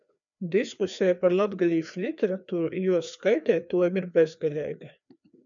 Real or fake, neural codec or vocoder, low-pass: fake; codec, 16 kHz, 2 kbps, FreqCodec, larger model; 7.2 kHz